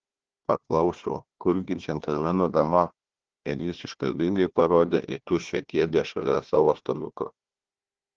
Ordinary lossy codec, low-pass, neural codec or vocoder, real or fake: Opus, 16 kbps; 7.2 kHz; codec, 16 kHz, 1 kbps, FunCodec, trained on Chinese and English, 50 frames a second; fake